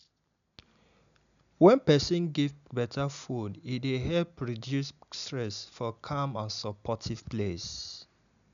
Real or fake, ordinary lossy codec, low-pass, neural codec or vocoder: real; none; 7.2 kHz; none